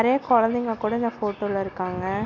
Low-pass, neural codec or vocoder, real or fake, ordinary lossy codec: 7.2 kHz; none; real; none